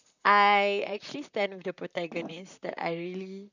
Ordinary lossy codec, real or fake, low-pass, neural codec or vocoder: none; fake; 7.2 kHz; vocoder, 44.1 kHz, 128 mel bands, Pupu-Vocoder